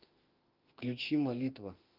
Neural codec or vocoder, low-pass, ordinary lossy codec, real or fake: autoencoder, 48 kHz, 32 numbers a frame, DAC-VAE, trained on Japanese speech; 5.4 kHz; Opus, 24 kbps; fake